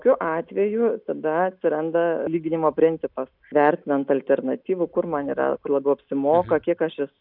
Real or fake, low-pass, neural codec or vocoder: real; 5.4 kHz; none